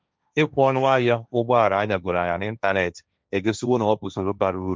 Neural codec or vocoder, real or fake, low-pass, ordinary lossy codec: codec, 16 kHz, 1.1 kbps, Voila-Tokenizer; fake; none; none